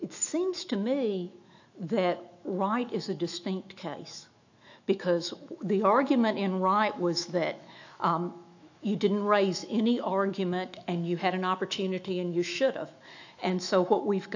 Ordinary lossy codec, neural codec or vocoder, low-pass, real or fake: AAC, 48 kbps; autoencoder, 48 kHz, 128 numbers a frame, DAC-VAE, trained on Japanese speech; 7.2 kHz; fake